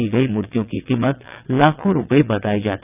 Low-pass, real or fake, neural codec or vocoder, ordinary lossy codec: 3.6 kHz; fake; vocoder, 22.05 kHz, 80 mel bands, WaveNeXt; none